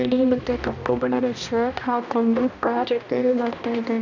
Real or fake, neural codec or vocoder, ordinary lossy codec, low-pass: fake; codec, 16 kHz, 1 kbps, X-Codec, HuBERT features, trained on balanced general audio; none; 7.2 kHz